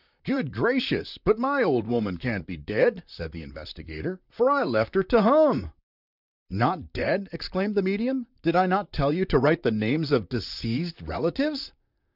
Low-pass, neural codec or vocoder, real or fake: 5.4 kHz; vocoder, 44.1 kHz, 128 mel bands, Pupu-Vocoder; fake